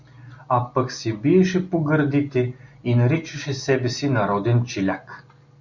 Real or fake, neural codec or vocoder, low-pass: real; none; 7.2 kHz